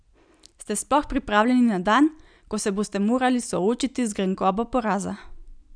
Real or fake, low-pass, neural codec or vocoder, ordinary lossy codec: real; 9.9 kHz; none; none